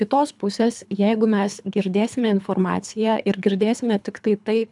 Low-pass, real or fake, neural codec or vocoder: 10.8 kHz; fake; codec, 24 kHz, 3 kbps, HILCodec